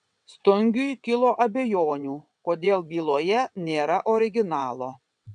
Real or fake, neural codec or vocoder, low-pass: fake; vocoder, 22.05 kHz, 80 mel bands, WaveNeXt; 9.9 kHz